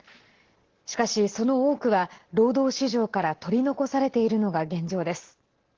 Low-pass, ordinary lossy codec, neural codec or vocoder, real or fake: 7.2 kHz; Opus, 16 kbps; none; real